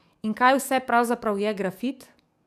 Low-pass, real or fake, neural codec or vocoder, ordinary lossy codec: 14.4 kHz; fake; codec, 44.1 kHz, 7.8 kbps, DAC; none